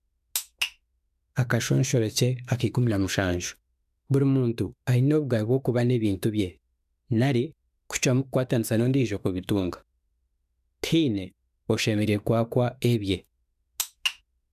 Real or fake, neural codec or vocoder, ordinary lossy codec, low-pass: fake; autoencoder, 48 kHz, 32 numbers a frame, DAC-VAE, trained on Japanese speech; none; 14.4 kHz